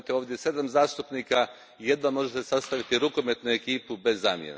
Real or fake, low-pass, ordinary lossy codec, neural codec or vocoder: real; none; none; none